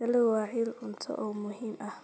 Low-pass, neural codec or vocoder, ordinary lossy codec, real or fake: none; none; none; real